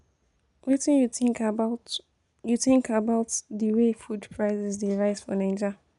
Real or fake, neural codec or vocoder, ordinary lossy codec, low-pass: real; none; none; 10.8 kHz